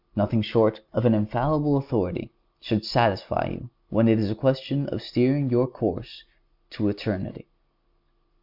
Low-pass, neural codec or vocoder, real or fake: 5.4 kHz; none; real